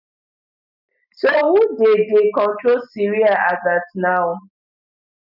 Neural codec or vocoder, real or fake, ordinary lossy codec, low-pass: none; real; none; 5.4 kHz